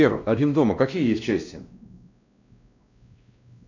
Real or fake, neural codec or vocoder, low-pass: fake; codec, 16 kHz, 1 kbps, X-Codec, WavLM features, trained on Multilingual LibriSpeech; 7.2 kHz